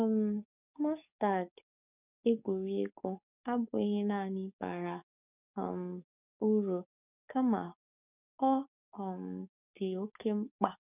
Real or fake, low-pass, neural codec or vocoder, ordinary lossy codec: fake; 3.6 kHz; codec, 44.1 kHz, 7.8 kbps, DAC; none